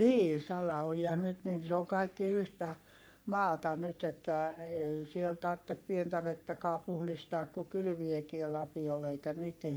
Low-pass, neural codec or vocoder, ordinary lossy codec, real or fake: none; codec, 44.1 kHz, 3.4 kbps, Pupu-Codec; none; fake